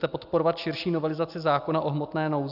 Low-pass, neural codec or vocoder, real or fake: 5.4 kHz; none; real